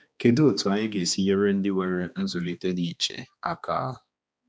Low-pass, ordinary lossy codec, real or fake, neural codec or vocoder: none; none; fake; codec, 16 kHz, 2 kbps, X-Codec, HuBERT features, trained on balanced general audio